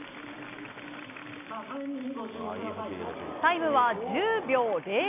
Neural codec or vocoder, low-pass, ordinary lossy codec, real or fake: none; 3.6 kHz; none; real